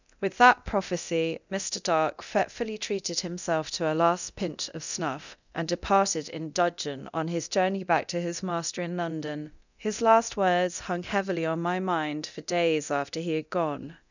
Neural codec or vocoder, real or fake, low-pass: codec, 24 kHz, 0.9 kbps, DualCodec; fake; 7.2 kHz